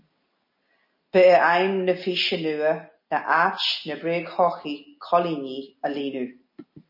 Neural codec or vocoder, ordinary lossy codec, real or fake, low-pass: none; MP3, 24 kbps; real; 5.4 kHz